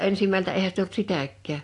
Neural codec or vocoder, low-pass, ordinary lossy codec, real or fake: none; 10.8 kHz; AAC, 48 kbps; real